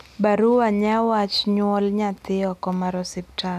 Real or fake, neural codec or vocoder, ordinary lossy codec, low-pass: real; none; none; 14.4 kHz